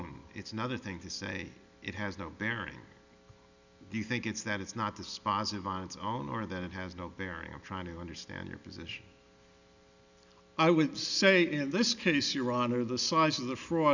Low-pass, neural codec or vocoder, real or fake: 7.2 kHz; none; real